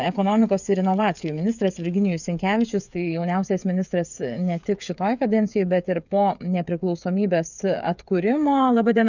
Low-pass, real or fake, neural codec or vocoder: 7.2 kHz; fake; codec, 16 kHz, 8 kbps, FreqCodec, smaller model